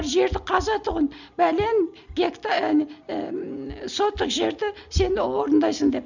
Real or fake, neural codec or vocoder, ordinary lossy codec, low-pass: real; none; none; 7.2 kHz